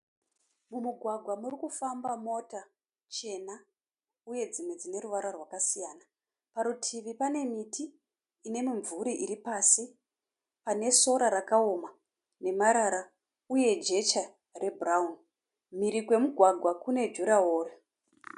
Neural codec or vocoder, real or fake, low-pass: none; real; 10.8 kHz